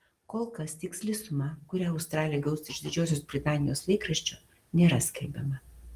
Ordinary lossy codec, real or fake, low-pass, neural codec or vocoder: Opus, 24 kbps; real; 14.4 kHz; none